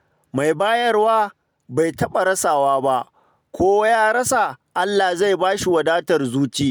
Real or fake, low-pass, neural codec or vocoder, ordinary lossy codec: real; none; none; none